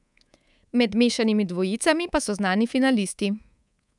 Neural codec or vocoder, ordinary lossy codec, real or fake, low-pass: codec, 24 kHz, 3.1 kbps, DualCodec; none; fake; 10.8 kHz